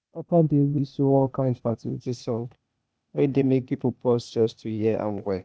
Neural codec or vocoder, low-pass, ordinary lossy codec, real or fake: codec, 16 kHz, 0.8 kbps, ZipCodec; none; none; fake